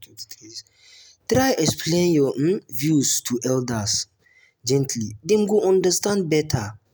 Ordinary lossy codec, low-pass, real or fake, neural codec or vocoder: none; none; real; none